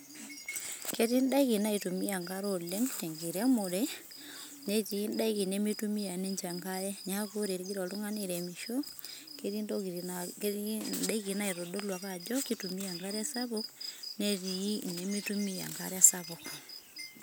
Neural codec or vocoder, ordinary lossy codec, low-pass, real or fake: none; none; none; real